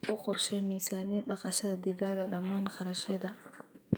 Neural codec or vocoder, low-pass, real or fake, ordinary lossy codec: codec, 44.1 kHz, 2.6 kbps, SNAC; none; fake; none